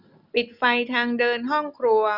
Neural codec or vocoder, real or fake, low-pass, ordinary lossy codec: none; real; 5.4 kHz; none